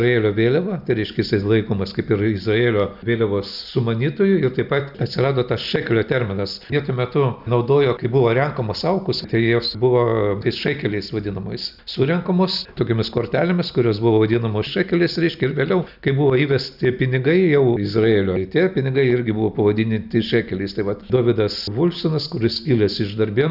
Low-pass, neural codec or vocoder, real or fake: 5.4 kHz; none; real